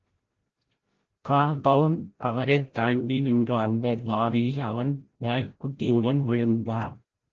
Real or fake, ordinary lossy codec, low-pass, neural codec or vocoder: fake; Opus, 16 kbps; 7.2 kHz; codec, 16 kHz, 0.5 kbps, FreqCodec, larger model